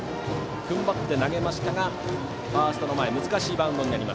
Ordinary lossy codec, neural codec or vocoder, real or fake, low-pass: none; none; real; none